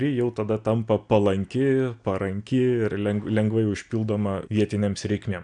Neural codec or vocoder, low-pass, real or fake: none; 9.9 kHz; real